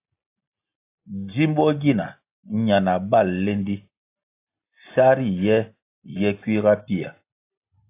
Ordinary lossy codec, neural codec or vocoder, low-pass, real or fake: AAC, 24 kbps; none; 3.6 kHz; real